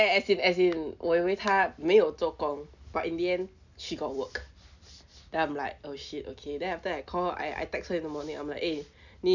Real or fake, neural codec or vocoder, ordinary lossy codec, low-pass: real; none; none; 7.2 kHz